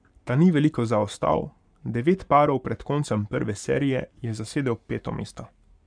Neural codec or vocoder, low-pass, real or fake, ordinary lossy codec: codec, 44.1 kHz, 7.8 kbps, Pupu-Codec; 9.9 kHz; fake; none